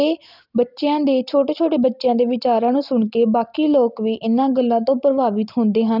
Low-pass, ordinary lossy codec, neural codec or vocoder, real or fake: 5.4 kHz; none; none; real